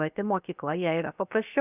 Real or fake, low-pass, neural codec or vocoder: fake; 3.6 kHz; codec, 16 kHz, 0.7 kbps, FocalCodec